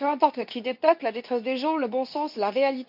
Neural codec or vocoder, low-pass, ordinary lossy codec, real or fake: codec, 24 kHz, 0.9 kbps, WavTokenizer, medium speech release version 2; 5.4 kHz; none; fake